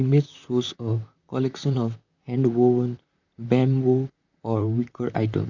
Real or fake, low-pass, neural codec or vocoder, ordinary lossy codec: fake; 7.2 kHz; vocoder, 44.1 kHz, 128 mel bands, Pupu-Vocoder; none